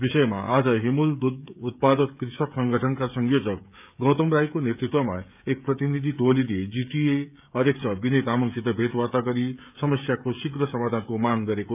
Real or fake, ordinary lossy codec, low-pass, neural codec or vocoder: fake; none; 3.6 kHz; codec, 16 kHz, 16 kbps, FreqCodec, smaller model